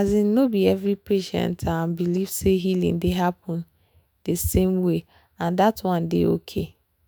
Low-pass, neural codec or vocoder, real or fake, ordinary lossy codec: none; autoencoder, 48 kHz, 128 numbers a frame, DAC-VAE, trained on Japanese speech; fake; none